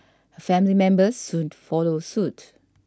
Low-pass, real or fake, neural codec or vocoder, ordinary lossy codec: none; real; none; none